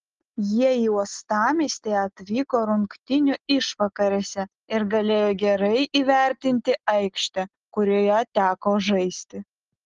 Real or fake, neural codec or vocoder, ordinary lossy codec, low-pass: real; none; Opus, 16 kbps; 7.2 kHz